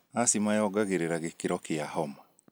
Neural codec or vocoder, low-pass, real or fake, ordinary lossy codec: none; none; real; none